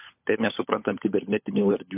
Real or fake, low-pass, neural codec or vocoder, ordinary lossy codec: fake; 3.6 kHz; codec, 16 kHz, 16 kbps, FunCodec, trained on LibriTTS, 50 frames a second; MP3, 32 kbps